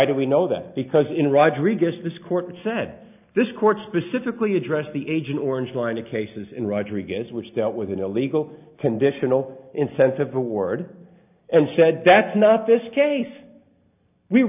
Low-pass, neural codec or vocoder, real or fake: 3.6 kHz; none; real